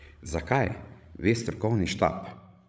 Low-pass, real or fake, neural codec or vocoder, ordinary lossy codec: none; fake; codec, 16 kHz, 16 kbps, FreqCodec, larger model; none